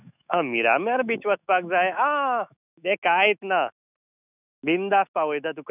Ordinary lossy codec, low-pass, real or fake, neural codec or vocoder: none; 3.6 kHz; fake; autoencoder, 48 kHz, 128 numbers a frame, DAC-VAE, trained on Japanese speech